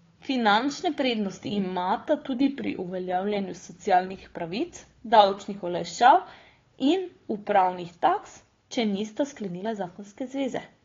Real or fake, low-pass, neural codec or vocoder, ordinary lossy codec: fake; 7.2 kHz; codec, 16 kHz, 4 kbps, FunCodec, trained on Chinese and English, 50 frames a second; AAC, 32 kbps